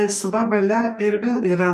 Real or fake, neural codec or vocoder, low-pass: fake; codec, 44.1 kHz, 2.6 kbps, DAC; 14.4 kHz